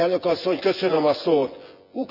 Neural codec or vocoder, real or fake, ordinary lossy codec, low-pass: vocoder, 24 kHz, 100 mel bands, Vocos; fake; none; 5.4 kHz